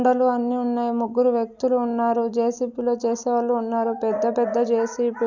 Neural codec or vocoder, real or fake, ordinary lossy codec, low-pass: none; real; none; 7.2 kHz